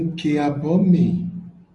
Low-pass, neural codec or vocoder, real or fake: 10.8 kHz; none; real